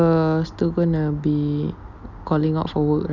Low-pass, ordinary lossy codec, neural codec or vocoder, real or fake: 7.2 kHz; none; none; real